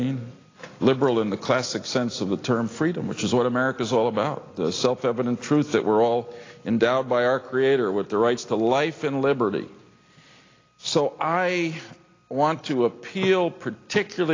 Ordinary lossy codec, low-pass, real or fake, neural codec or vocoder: AAC, 32 kbps; 7.2 kHz; real; none